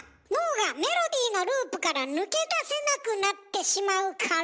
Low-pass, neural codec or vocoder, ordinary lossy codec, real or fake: none; none; none; real